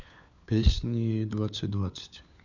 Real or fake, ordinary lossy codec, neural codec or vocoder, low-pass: fake; none; codec, 16 kHz, 4 kbps, FunCodec, trained on LibriTTS, 50 frames a second; 7.2 kHz